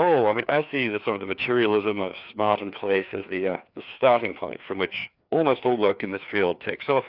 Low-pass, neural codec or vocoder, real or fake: 5.4 kHz; codec, 16 kHz, 2 kbps, FreqCodec, larger model; fake